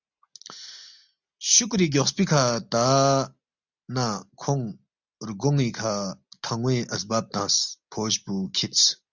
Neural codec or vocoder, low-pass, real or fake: none; 7.2 kHz; real